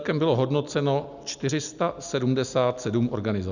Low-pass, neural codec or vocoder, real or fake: 7.2 kHz; none; real